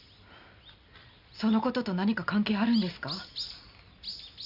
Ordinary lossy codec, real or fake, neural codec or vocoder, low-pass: none; real; none; 5.4 kHz